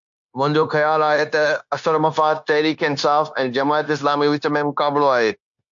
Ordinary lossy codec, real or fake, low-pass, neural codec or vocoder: AAC, 64 kbps; fake; 7.2 kHz; codec, 16 kHz, 0.9 kbps, LongCat-Audio-Codec